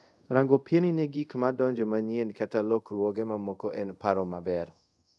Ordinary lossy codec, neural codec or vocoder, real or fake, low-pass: none; codec, 24 kHz, 0.5 kbps, DualCodec; fake; none